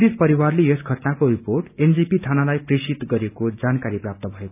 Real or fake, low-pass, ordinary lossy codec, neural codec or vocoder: real; 3.6 kHz; none; none